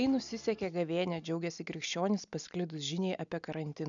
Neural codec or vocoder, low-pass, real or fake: none; 7.2 kHz; real